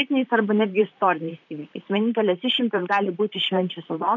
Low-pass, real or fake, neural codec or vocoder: 7.2 kHz; fake; vocoder, 44.1 kHz, 128 mel bands, Pupu-Vocoder